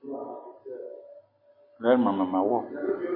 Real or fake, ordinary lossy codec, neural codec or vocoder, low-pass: real; MP3, 24 kbps; none; 5.4 kHz